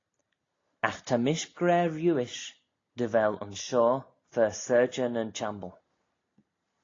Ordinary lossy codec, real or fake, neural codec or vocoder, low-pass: AAC, 32 kbps; real; none; 7.2 kHz